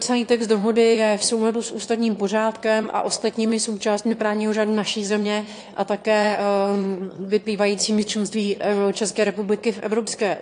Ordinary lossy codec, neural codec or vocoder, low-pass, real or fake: AAC, 48 kbps; autoencoder, 22.05 kHz, a latent of 192 numbers a frame, VITS, trained on one speaker; 9.9 kHz; fake